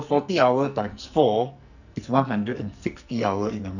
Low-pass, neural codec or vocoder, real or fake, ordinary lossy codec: 7.2 kHz; codec, 44.1 kHz, 2.6 kbps, SNAC; fake; none